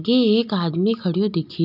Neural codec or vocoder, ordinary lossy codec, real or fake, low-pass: none; none; real; 5.4 kHz